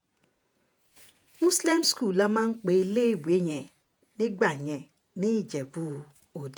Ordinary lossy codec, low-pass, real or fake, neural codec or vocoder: none; none; fake; vocoder, 48 kHz, 128 mel bands, Vocos